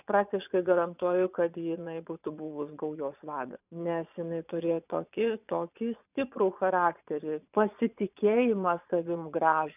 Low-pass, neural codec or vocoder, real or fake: 3.6 kHz; vocoder, 22.05 kHz, 80 mel bands, Vocos; fake